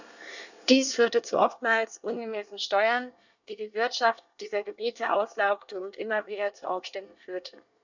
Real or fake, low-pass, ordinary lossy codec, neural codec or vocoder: fake; 7.2 kHz; none; codec, 24 kHz, 1 kbps, SNAC